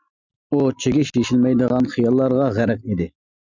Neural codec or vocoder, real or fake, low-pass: none; real; 7.2 kHz